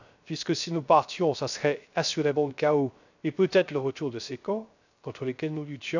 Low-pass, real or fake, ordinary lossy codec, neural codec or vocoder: 7.2 kHz; fake; none; codec, 16 kHz, 0.3 kbps, FocalCodec